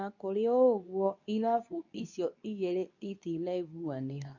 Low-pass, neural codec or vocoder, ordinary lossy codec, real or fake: 7.2 kHz; codec, 24 kHz, 0.9 kbps, WavTokenizer, medium speech release version 2; none; fake